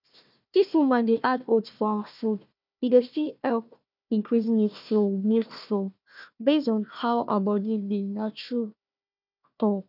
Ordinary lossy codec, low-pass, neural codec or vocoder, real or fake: none; 5.4 kHz; codec, 16 kHz, 1 kbps, FunCodec, trained on Chinese and English, 50 frames a second; fake